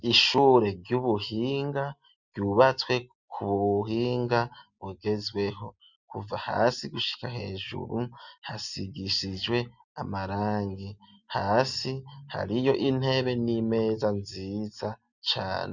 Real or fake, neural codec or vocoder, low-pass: real; none; 7.2 kHz